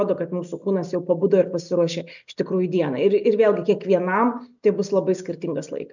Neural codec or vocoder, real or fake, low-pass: none; real; 7.2 kHz